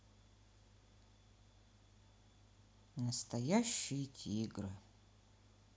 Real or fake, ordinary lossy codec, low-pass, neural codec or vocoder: real; none; none; none